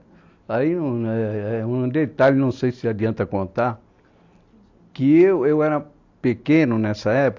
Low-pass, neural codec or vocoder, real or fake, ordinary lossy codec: 7.2 kHz; none; real; AAC, 48 kbps